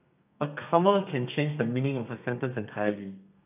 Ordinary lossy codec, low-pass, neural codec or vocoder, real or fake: none; 3.6 kHz; codec, 32 kHz, 1.9 kbps, SNAC; fake